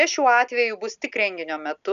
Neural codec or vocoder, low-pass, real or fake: none; 7.2 kHz; real